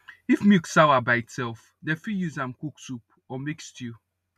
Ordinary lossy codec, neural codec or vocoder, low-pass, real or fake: none; none; 14.4 kHz; real